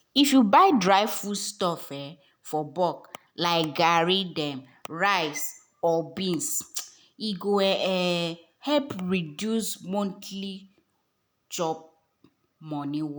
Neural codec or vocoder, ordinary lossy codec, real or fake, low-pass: none; none; real; none